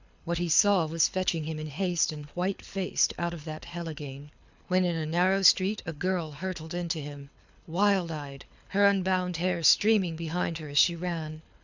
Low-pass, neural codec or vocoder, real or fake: 7.2 kHz; codec, 24 kHz, 6 kbps, HILCodec; fake